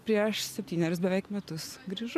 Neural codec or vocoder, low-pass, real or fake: none; 14.4 kHz; real